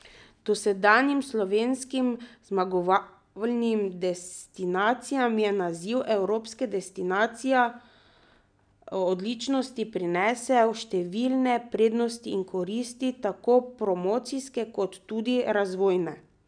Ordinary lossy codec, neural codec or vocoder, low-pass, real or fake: none; none; 9.9 kHz; real